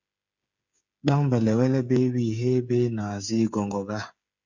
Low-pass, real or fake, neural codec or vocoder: 7.2 kHz; fake; codec, 16 kHz, 8 kbps, FreqCodec, smaller model